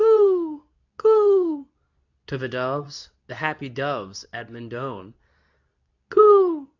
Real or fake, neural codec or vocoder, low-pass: fake; codec, 24 kHz, 0.9 kbps, WavTokenizer, medium speech release version 2; 7.2 kHz